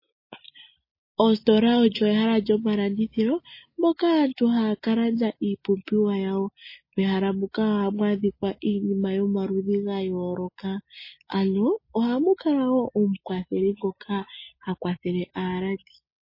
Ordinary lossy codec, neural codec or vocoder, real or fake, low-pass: MP3, 24 kbps; none; real; 5.4 kHz